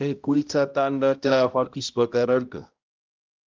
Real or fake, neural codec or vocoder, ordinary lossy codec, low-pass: fake; codec, 16 kHz, 0.5 kbps, X-Codec, HuBERT features, trained on balanced general audio; Opus, 32 kbps; 7.2 kHz